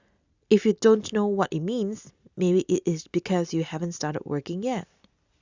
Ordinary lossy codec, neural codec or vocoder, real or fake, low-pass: Opus, 64 kbps; none; real; 7.2 kHz